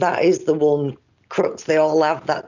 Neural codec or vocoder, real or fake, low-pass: none; real; 7.2 kHz